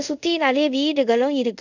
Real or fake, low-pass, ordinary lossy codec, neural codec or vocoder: fake; 7.2 kHz; none; codec, 24 kHz, 0.5 kbps, DualCodec